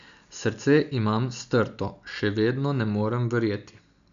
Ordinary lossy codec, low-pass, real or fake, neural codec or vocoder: none; 7.2 kHz; real; none